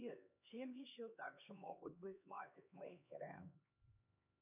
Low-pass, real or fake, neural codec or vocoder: 3.6 kHz; fake; codec, 16 kHz, 2 kbps, X-Codec, HuBERT features, trained on LibriSpeech